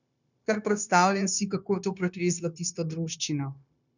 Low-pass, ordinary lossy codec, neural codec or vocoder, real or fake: 7.2 kHz; none; codec, 16 kHz, 0.9 kbps, LongCat-Audio-Codec; fake